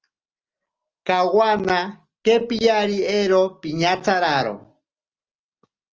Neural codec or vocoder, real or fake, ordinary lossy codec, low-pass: none; real; Opus, 24 kbps; 7.2 kHz